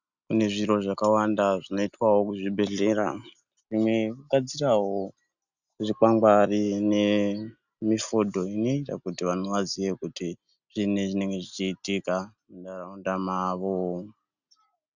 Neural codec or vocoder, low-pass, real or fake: none; 7.2 kHz; real